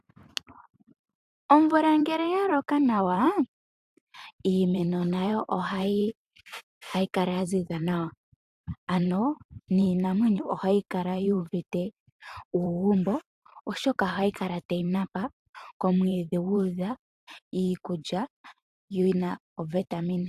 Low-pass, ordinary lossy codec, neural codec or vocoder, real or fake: 14.4 kHz; AAC, 96 kbps; vocoder, 44.1 kHz, 128 mel bands every 512 samples, BigVGAN v2; fake